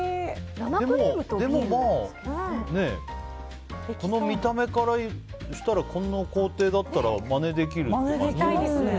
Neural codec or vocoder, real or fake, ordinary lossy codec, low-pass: none; real; none; none